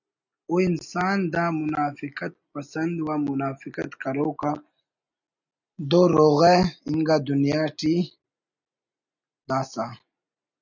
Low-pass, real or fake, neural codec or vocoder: 7.2 kHz; real; none